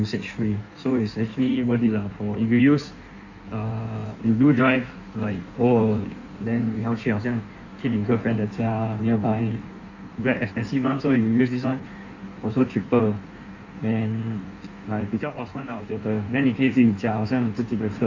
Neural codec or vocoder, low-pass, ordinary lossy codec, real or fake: codec, 16 kHz in and 24 kHz out, 1.1 kbps, FireRedTTS-2 codec; 7.2 kHz; none; fake